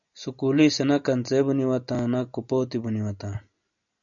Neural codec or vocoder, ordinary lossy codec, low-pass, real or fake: none; MP3, 96 kbps; 7.2 kHz; real